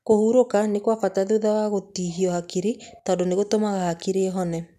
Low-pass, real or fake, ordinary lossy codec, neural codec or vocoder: 14.4 kHz; real; none; none